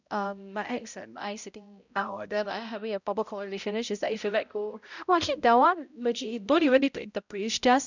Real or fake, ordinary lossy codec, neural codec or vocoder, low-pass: fake; none; codec, 16 kHz, 0.5 kbps, X-Codec, HuBERT features, trained on balanced general audio; 7.2 kHz